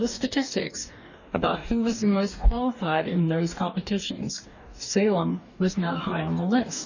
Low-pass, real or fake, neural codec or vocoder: 7.2 kHz; fake; codec, 44.1 kHz, 2.6 kbps, DAC